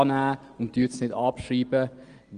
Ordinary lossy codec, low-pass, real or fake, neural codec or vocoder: Opus, 24 kbps; 9.9 kHz; real; none